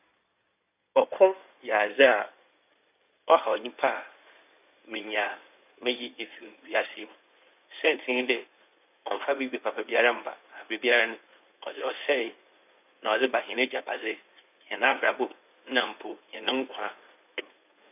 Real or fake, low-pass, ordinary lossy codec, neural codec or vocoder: fake; 3.6 kHz; none; codec, 16 kHz in and 24 kHz out, 2.2 kbps, FireRedTTS-2 codec